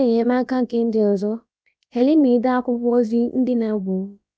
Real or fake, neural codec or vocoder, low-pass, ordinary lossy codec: fake; codec, 16 kHz, about 1 kbps, DyCAST, with the encoder's durations; none; none